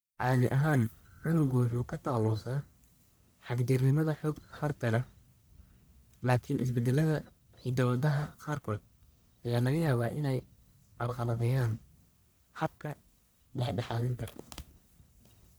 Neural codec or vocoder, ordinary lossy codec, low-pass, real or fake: codec, 44.1 kHz, 1.7 kbps, Pupu-Codec; none; none; fake